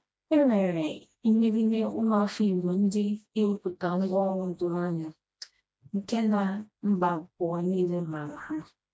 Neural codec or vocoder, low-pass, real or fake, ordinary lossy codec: codec, 16 kHz, 1 kbps, FreqCodec, smaller model; none; fake; none